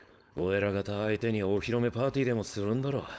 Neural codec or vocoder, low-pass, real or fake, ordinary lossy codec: codec, 16 kHz, 4.8 kbps, FACodec; none; fake; none